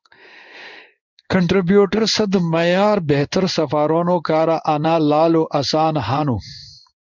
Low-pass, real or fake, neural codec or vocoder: 7.2 kHz; fake; codec, 16 kHz in and 24 kHz out, 1 kbps, XY-Tokenizer